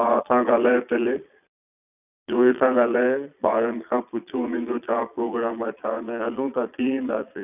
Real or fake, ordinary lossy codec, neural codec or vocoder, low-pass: fake; none; vocoder, 22.05 kHz, 80 mel bands, Vocos; 3.6 kHz